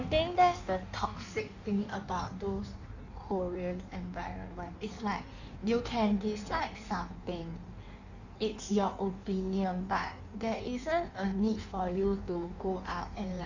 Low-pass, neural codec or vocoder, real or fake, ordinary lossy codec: 7.2 kHz; codec, 16 kHz in and 24 kHz out, 1.1 kbps, FireRedTTS-2 codec; fake; none